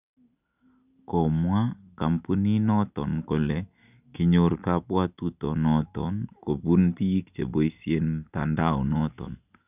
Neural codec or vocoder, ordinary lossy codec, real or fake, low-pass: none; none; real; 3.6 kHz